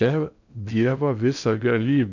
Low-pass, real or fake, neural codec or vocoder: 7.2 kHz; fake; codec, 16 kHz in and 24 kHz out, 0.6 kbps, FocalCodec, streaming, 2048 codes